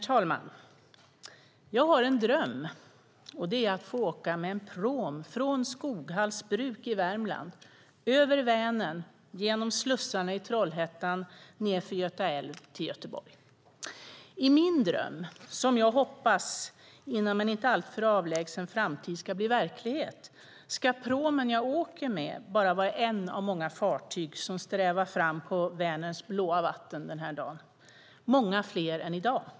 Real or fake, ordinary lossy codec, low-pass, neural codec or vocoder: real; none; none; none